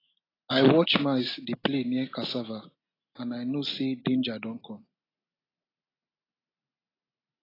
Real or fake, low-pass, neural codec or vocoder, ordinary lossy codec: real; 5.4 kHz; none; AAC, 24 kbps